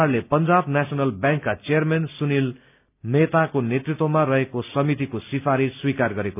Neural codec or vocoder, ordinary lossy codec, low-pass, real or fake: none; none; 3.6 kHz; real